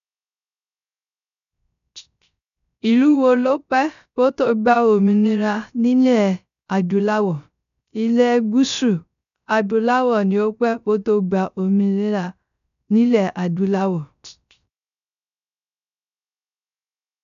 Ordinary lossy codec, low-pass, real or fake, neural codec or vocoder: none; 7.2 kHz; fake; codec, 16 kHz, 0.3 kbps, FocalCodec